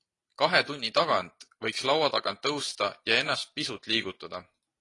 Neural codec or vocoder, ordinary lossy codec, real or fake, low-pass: none; AAC, 32 kbps; real; 10.8 kHz